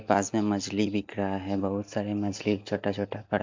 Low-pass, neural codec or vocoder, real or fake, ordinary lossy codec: 7.2 kHz; none; real; MP3, 64 kbps